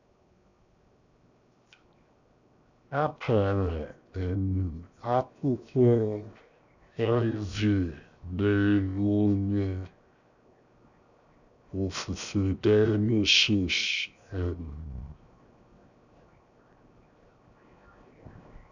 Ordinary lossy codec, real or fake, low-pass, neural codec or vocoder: none; fake; 7.2 kHz; codec, 16 kHz, 0.7 kbps, FocalCodec